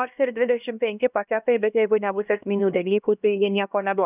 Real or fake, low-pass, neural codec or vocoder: fake; 3.6 kHz; codec, 16 kHz, 1 kbps, X-Codec, HuBERT features, trained on LibriSpeech